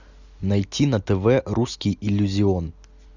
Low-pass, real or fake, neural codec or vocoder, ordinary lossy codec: 7.2 kHz; real; none; Opus, 64 kbps